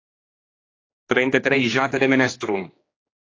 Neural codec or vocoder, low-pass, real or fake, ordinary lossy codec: codec, 16 kHz, 2 kbps, X-Codec, HuBERT features, trained on general audio; 7.2 kHz; fake; AAC, 32 kbps